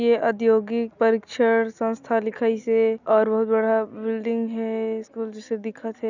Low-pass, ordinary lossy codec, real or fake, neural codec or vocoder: 7.2 kHz; none; real; none